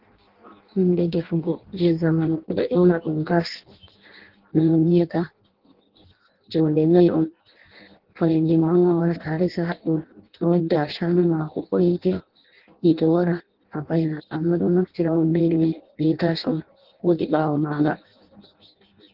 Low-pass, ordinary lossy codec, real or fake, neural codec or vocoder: 5.4 kHz; Opus, 16 kbps; fake; codec, 16 kHz in and 24 kHz out, 0.6 kbps, FireRedTTS-2 codec